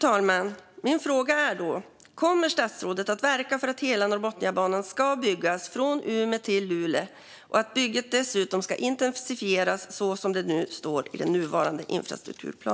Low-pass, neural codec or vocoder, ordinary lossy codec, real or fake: none; none; none; real